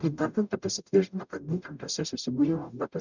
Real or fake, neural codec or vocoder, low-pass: fake; codec, 44.1 kHz, 0.9 kbps, DAC; 7.2 kHz